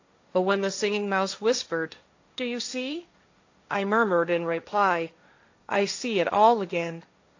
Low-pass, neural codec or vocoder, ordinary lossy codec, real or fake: 7.2 kHz; codec, 16 kHz, 1.1 kbps, Voila-Tokenizer; AAC, 48 kbps; fake